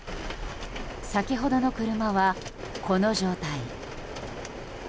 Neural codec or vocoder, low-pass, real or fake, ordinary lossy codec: none; none; real; none